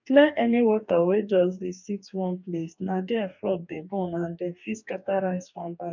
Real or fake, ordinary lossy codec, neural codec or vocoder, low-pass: fake; none; codec, 44.1 kHz, 2.6 kbps, DAC; 7.2 kHz